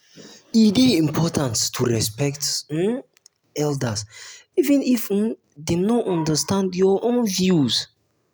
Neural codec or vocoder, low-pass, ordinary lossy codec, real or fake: none; none; none; real